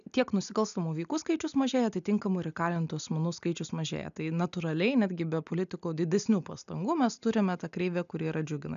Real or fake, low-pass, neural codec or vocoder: real; 7.2 kHz; none